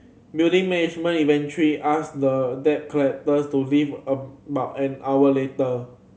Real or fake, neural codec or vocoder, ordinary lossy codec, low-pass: real; none; none; none